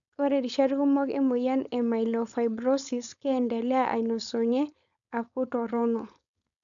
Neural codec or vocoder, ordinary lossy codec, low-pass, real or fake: codec, 16 kHz, 4.8 kbps, FACodec; none; 7.2 kHz; fake